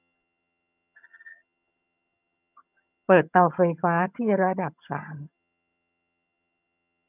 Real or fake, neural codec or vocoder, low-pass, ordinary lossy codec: fake; vocoder, 22.05 kHz, 80 mel bands, HiFi-GAN; 3.6 kHz; none